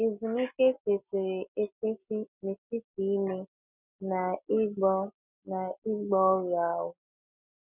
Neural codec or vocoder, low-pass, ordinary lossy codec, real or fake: none; 3.6 kHz; none; real